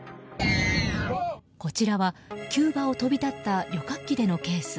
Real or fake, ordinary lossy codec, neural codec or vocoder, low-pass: real; none; none; none